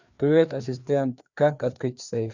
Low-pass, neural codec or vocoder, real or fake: 7.2 kHz; codec, 16 kHz, 2 kbps, FreqCodec, larger model; fake